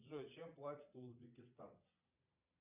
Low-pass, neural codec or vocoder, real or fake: 3.6 kHz; vocoder, 22.05 kHz, 80 mel bands, Vocos; fake